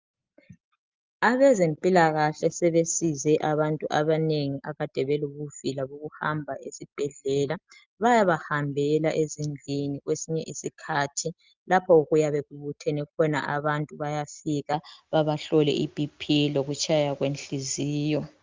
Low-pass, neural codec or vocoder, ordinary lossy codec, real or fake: 7.2 kHz; none; Opus, 24 kbps; real